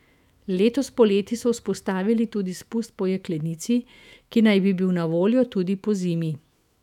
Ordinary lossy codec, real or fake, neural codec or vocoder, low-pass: none; fake; autoencoder, 48 kHz, 128 numbers a frame, DAC-VAE, trained on Japanese speech; 19.8 kHz